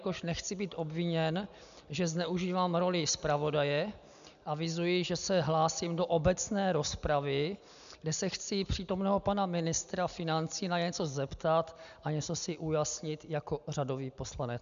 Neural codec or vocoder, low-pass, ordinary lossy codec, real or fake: none; 7.2 kHz; MP3, 96 kbps; real